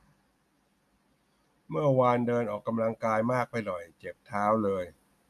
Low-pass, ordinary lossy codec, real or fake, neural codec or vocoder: 14.4 kHz; none; real; none